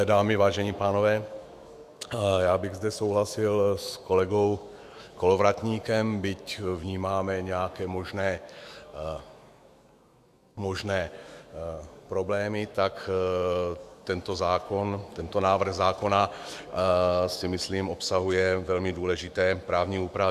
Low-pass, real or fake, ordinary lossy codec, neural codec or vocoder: 14.4 kHz; fake; Opus, 64 kbps; autoencoder, 48 kHz, 128 numbers a frame, DAC-VAE, trained on Japanese speech